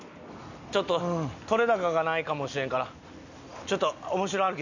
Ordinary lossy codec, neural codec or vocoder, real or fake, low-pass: none; none; real; 7.2 kHz